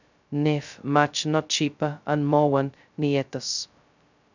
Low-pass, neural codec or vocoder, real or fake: 7.2 kHz; codec, 16 kHz, 0.2 kbps, FocalCodec; fake